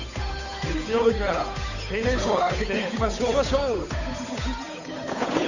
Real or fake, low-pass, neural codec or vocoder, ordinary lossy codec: fake; 7.2 kHz; codec, 16 kHz, 8 kbps, FunCodec, trained on Chinese and English, 25 frames a second; none